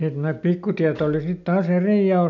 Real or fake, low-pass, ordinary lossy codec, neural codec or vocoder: real; 7.2 kHz; none; none